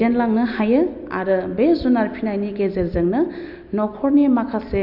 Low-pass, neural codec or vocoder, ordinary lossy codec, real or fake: 5.4 kHz; none; none; real